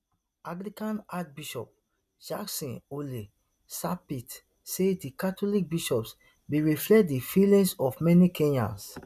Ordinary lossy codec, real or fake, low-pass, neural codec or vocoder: none; real; 14.4 kHz; none